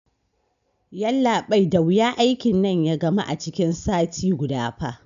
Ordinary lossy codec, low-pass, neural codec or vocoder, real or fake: none; 7.2 kHz; none; real